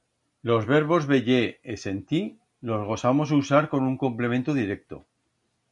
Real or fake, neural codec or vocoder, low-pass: real; none; 10.8 kHz